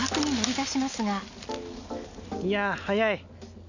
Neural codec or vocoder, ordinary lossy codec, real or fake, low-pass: none; none; real; 7.2 kHz